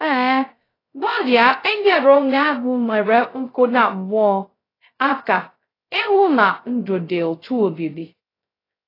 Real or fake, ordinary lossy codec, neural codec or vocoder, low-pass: fake; AAC, 24 kbps; codec, 16 kHz, 0.2 kbps, FocalCodec; 5.4 kHz